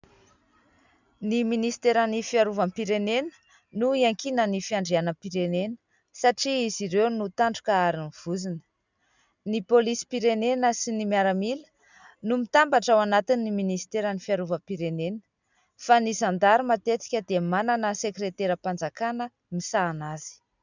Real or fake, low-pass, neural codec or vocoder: real; 7.2 kHz; none